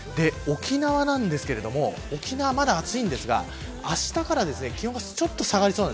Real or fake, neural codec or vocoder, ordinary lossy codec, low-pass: real; none; none; none